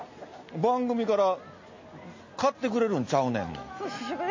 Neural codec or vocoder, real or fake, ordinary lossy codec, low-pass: none; real; MP3, 32 kbps; 7.2 kHz